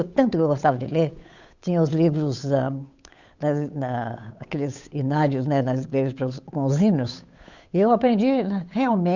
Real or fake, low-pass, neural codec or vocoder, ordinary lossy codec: fake; 7.2 kHz; codec, 16 kHz, 8 kbps, FunCodec, trained on Chinese and English, 25 frames a second; Opus, 64 kbps